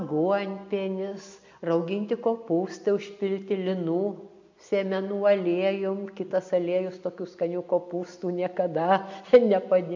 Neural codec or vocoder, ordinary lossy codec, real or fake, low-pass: none; MP3, 64 kbps; real; 7.2 kHz